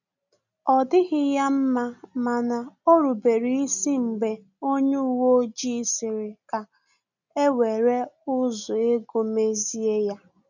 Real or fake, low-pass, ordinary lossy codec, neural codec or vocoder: real; 7.2 kHz; none; none